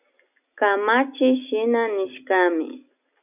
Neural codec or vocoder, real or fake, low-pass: none; real; 3.6 kHz